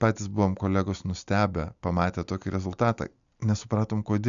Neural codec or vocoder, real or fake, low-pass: none; real; 7.2 kHz